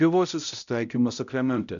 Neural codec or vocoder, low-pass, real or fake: codec, 16 kHz, 0.5 kbps, X-Codec, HuBERT features, trained on balanced general audio; 7.2 kHz; fake